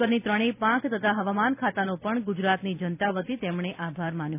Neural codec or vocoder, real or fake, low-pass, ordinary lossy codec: none; real; 3.6 kHz; none